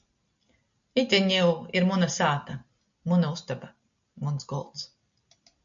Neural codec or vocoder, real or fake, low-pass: none; real; 7.2 kHz